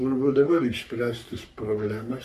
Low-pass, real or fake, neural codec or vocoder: 14.4 kHz; fake; codec, 44.1 kHz, 3.4 kbps, Pupu-Codec